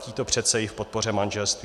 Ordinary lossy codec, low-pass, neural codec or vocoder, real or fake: AAC, 96 kbps; 14.4 kHz; none; real